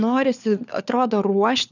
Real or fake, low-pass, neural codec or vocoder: fake; 7.2 kHz; codec, 16 kHz, 4 kbps, FunCodec, trained on LibriTTS, 50 frames a second